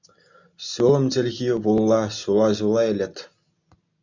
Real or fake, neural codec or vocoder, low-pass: real; none; 7.2 kHz